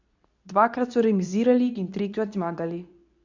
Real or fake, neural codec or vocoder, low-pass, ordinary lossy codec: fake; codec, 24 kHz, 0.9 kbps, WavTokenizer, medium speech release version 2; 7.2 kHz; none